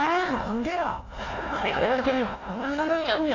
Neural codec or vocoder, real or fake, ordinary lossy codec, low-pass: codec, 16 kHz, 1 kbps, FunCodec, trained on Chinese and English, 50 frames a second; fake; AAC, 32 kbps; 7.2 kHz